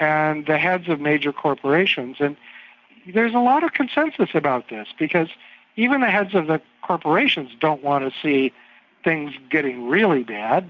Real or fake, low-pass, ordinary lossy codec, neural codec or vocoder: real; 7.2 kHz; MP3, 64 kbps; none